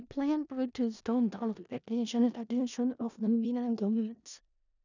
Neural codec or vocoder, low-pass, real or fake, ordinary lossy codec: codec, 16 kHz in and 24 kHz out, 0.4 kbps, LongCat-Audio-Codec, four codebook decoder; 7.2 kHz; fake; none